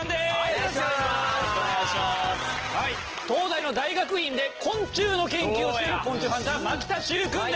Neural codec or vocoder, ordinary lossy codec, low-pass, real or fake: none; Opus, 16 kbps; 7.2 kHz; real